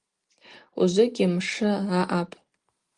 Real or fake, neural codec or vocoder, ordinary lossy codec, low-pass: real; none; Opus, 24 kbps; 10.8 kHz